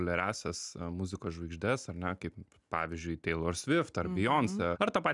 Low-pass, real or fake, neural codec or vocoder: 10.8 kHz; real; none